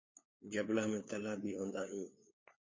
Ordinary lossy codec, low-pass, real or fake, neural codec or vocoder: MP3, 32 kbps; 7.2 kHz; fake; codec, 16 kHz, 4 kbps, FunCodec, trained on LibriTTS, 50 frames a second